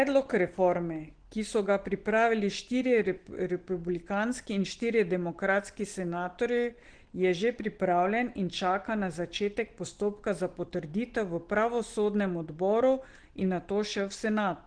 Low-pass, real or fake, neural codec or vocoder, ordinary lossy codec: 9.9 kHz; real; none; Opus, 16 kbps